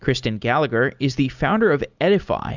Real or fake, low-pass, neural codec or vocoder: real; 7.2 kHz; none